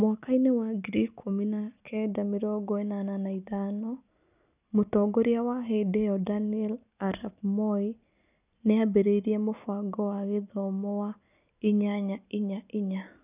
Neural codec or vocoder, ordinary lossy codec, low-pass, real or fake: none; none; 3.6 kHz; real